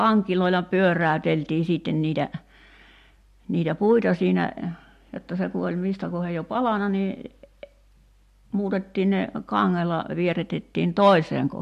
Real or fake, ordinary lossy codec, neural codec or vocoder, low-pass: real; MP3, 64 kbps; none; 14.4 kHz